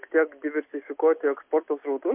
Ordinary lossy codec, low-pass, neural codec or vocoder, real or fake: MP3, 32 kbps; 3.6 kHz; none; real